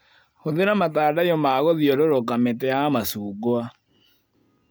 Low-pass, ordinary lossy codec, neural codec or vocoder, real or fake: none; none; none; real